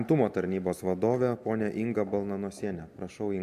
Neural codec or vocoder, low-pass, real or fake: none; 14.4 kHz; real